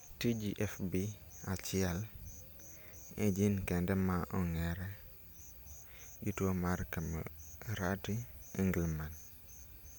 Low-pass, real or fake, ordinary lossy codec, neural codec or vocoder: none; real; none; none